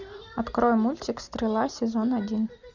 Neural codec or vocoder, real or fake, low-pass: none; real; 7.2 kHz